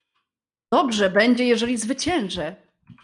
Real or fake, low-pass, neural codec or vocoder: real; 10.8 kHz; none